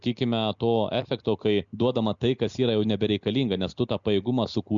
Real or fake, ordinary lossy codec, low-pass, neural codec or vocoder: real; MP3, 96 kbps; 7.2 kHz; none